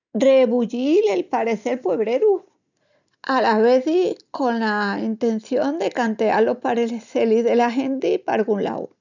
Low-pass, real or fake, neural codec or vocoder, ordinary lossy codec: 7.2 kHz; real; none; none